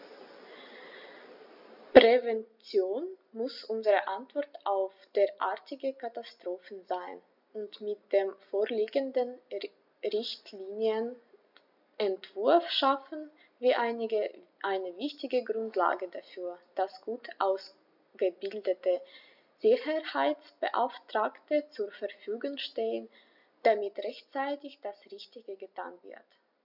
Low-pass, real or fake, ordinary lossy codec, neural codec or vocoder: 5.4 kHz; real; none; none